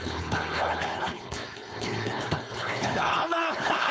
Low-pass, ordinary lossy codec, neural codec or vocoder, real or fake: none; none; codec, 16 kHz, 4.8 kbps, FACodec; fake